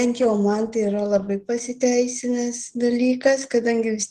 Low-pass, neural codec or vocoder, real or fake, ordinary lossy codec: 14.4 kHz; none; real; Opus, 16 kbps